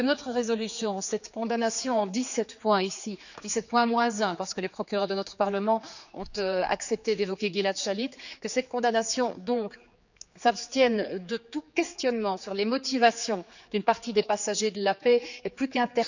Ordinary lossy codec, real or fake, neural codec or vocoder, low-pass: none; fake; codec, 16 kHz, 4 kbps, X-Codec, HuBERT features, trained on general audio; 7.2 kHz